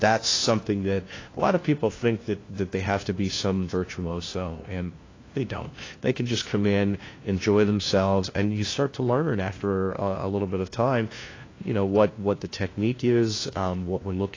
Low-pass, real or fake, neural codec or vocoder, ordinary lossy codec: 7.2 kHz; fake; codec, 16 kHz, 1 kbps, FunCodec, trained on LibriTTS, 50 frames a second; AAC, 32 kbps